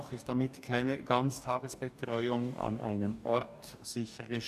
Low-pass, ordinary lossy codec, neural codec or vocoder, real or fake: 14.4 kHz; AAC, 96 kbps; codec, 44.1 kHz, 2.6 kbps, DAC; fake